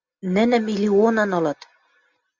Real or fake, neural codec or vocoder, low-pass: real; none; 7.2 kHz